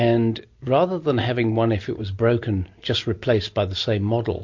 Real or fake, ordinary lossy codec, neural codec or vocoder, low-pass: real; MP3, 48 kbps; none; 7.2 kHz